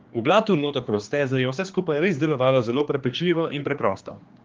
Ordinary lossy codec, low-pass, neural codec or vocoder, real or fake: Opus, 32 kbps; 7.2 kHz; codec, 16 kHz, 2 kbps, X-Codec, HuBERT features, trained on general audio; fake